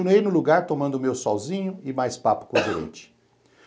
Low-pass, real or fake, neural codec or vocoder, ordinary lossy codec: none; real; none; none